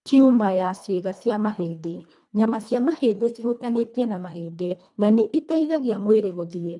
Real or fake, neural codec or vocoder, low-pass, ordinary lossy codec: fake; codec, 24 kHz, 1.5 kbps, HILCodec; 10.8 kHz; none